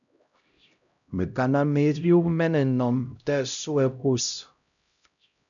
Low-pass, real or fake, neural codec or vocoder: 7.2 kHz; fake; codec, 16 kHz, 0.5 kbps, X-Codec, HuBERT features, trained on LibriSpeech